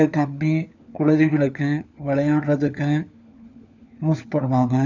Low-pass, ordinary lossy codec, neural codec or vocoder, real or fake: 7.2 kHz; none; codec, 16 kHz, 4 kbps, FunCodec, trained on LibriTTS, 50 frames a second; fake